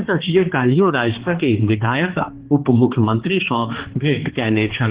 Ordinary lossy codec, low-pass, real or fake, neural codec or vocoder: Opus, 16 kbps; 3.6 kHz; fake; codec, 16 kHz, 2 kbps, X-Codec, HuBERT features, trained on balanced general audio